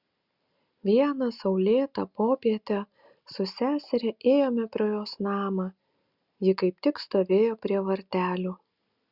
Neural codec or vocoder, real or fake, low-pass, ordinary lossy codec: none; real; 5.4 kHz; Opus, 64 kbps